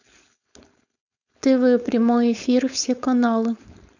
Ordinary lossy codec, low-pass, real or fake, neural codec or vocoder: none; 7.2 kHz; fake; codec, 16 kHz, 4.8 kbps, FACodec